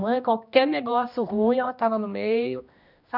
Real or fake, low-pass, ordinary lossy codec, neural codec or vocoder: fake; 5.4 kHz; none; codec, 16 kHz, 1 kbps, X-Codec, HuBERT features, trained on general audio